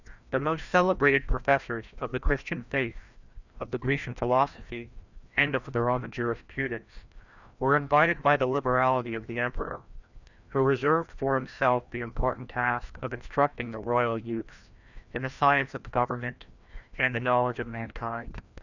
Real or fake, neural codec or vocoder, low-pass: fake; codec, 16 kHz, 1 kbps, FreqCodec, larger model; 7.2 kHz